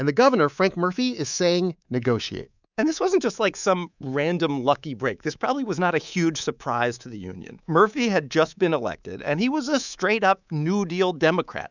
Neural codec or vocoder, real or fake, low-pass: codec, 24 kHz, 3.1 kbps, DualCodec; fake; 7.2 kHz